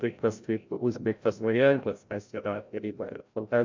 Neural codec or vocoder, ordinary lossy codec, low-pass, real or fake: codec, 16 kHz, 0.5 kbps, FreqCodec, larger model; MP3, 64 kbps; 7.2 kHz; fake